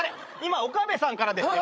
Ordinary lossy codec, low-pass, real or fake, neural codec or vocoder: none; none; fake; codec, 16 kHz, 16 kbps, FreqCodec, larger model